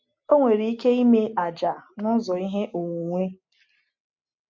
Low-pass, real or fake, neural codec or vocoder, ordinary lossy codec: 7.2 kHz; real; none; MP3, 48 kbps